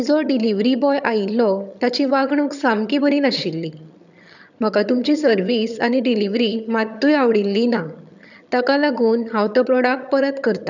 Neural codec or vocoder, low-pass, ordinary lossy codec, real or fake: vocoder, 22.05 kHz, 80 mel bands, HiFi-GAN; 7.2 kHz; none; fake